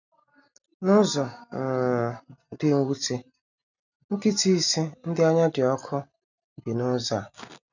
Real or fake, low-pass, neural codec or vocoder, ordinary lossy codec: real; 7.2 kHz; none; none